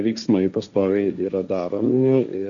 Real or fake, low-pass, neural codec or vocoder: fake; 7.2 kHz; codec, 16 kHz, 1.1 kbps, Voila-Tokenizer